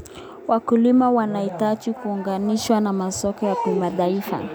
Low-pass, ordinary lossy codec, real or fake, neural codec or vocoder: none; none; real; none